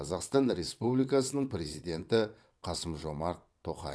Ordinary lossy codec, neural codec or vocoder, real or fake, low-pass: none; vocoder, 22.05 kHz, 80 mel bands, WaveNeXt; fake; none